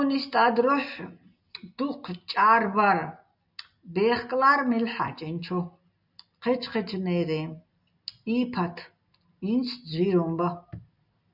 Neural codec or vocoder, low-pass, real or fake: none; 5.4 kHz; real